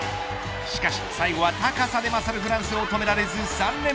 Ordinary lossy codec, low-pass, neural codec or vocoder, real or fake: none; none; none; real